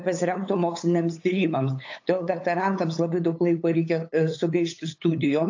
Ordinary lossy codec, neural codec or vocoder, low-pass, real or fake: AAC, 48 kbps; codec, 16 kHz, 8 kbps, FunCodec, trained on LibriTTS, 25 frames a second; 7.2 kHz; fake